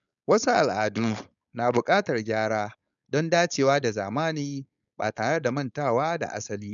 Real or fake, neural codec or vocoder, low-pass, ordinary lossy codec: fake; codec, 16 kHz, 4.8 kbps, FACodec; 7.2 kHz; none